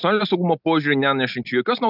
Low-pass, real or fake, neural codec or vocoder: 5.4 kHz; real; none